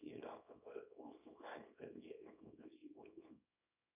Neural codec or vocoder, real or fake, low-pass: codec, 24 kHz, 0.9 kbps, WavTokenizer, small release; fake; 3.6 kHz